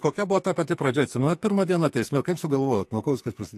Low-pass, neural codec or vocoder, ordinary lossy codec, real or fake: 14.4 kHz; codec, 44.1 kHz, 2.6 kbps, SNAC; AAC, 64 kbps; fake